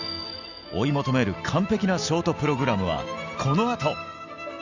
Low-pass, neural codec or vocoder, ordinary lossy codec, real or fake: 7.2 kHz; none; Opus, 64 kbps; real